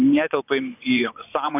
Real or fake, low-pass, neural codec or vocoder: fake; 3.6 kHz; vocoder, 24 kHz, 100 mel bands, Vocos